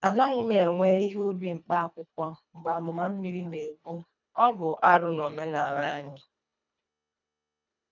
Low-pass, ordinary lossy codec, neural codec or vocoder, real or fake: 7.2 kHz; none; codec, 24 kHz, 1.5 kbps, HILCodec; fake